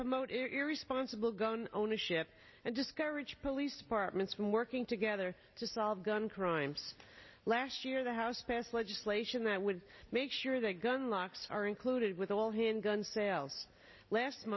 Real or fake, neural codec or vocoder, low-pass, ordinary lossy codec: real; none; 7.2 kHz; MP3, 24 kbps